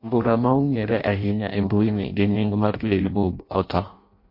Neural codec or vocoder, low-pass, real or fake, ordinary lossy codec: codec, 16 kHz in and 24 kHz out, 0.6 kbps, FireRedTTS-2 codec; 5.4 kHz; fake; MP3, 32 kbps